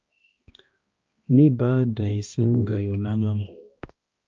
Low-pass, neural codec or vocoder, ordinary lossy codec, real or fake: 7.2 kHz; codec, 16 kHz, 1 kbps, X-Codec, HuBERT features, trained on balanced general audio; Opus, 24 kbps; fake